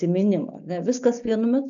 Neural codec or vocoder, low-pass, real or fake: none; 7.2 kHz; real